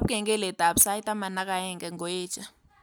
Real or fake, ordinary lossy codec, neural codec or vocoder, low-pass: real; none; none; none